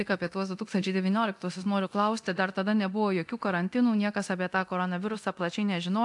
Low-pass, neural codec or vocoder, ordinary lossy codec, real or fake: 10.8 kHz; codec, 24 kHz, 0.9 kbps, DualCodec; AAC, 64 kbps; fake